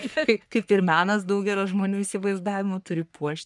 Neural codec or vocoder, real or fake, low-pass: codec, 44.1 kHz, 3.4 kbps, Pupu-Codec; fake; 10.8 kHz